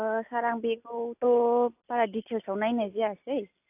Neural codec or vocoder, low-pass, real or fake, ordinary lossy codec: none; 3.6 kHz; real; AAC, 32 kbps